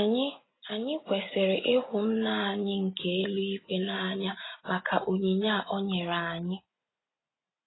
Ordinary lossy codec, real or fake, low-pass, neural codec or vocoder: AAC, 16 kbps; real; 7.2 kHz; none